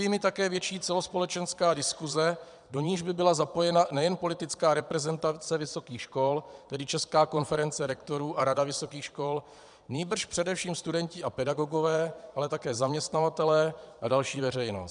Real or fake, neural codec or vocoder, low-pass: fake; vocoder, 22.05 kHz, 80 mel bands, Vocos; 9.9 kHz